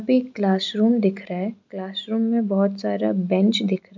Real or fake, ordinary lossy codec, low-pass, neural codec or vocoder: real; MP3, 64 kbps; 7.2 kHz; none